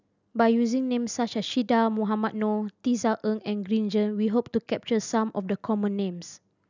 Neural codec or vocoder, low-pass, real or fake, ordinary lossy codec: none; 7.2 kHz; real; none